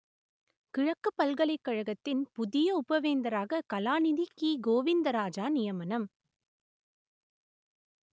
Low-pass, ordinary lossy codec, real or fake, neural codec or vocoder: none; none; real; none